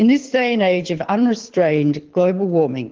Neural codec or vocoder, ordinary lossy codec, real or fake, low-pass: codec, 24 kHz, 6 kbps, HILCodec; Opus, 16 kbps; fake; 7.2 kHz